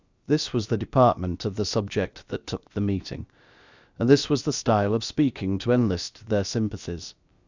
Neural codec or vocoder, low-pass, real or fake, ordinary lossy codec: codec, 16 kHz, about 1 kbps, DyCAST, with the encoder's durations; 7.2 kHz; fake; Opus, 64 kbps